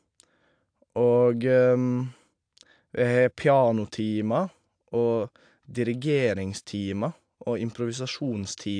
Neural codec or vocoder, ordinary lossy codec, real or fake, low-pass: none; AAC, 64 kbps; real; 9.9 kHz